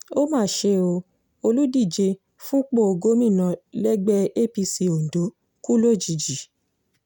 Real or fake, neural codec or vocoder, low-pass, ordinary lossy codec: real; none; none; none